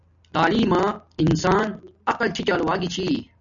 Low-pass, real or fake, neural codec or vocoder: 7.2 kHz; real; none